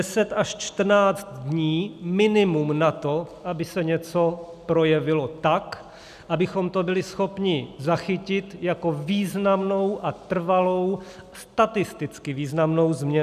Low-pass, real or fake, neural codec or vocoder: 14.4 kHz; real; none